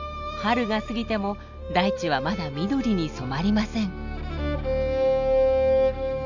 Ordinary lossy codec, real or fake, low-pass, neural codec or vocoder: none; real; 7.2 kHz; none